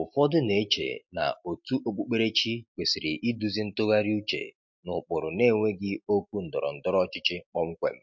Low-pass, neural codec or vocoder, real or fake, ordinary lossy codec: 7.2 kHz; none; real; MP3, 48 kbps